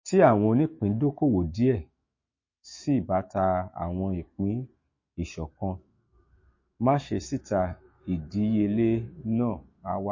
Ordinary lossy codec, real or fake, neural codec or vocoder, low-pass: MP3, 32 kbps; fake; autoencoder, 48 kHz, 128 numbers a frame, DAC-VAE, trained on Japanese speech; 7.2 kHz